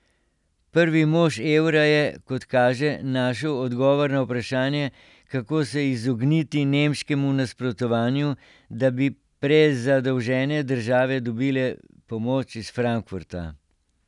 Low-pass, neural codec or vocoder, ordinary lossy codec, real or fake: 10.8 kHz; none; none; real